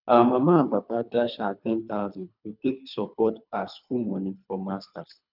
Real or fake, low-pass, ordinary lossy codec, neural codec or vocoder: fake; 5.4 kHz; none; codec, 24 kHz, 3 kbps, HILCodec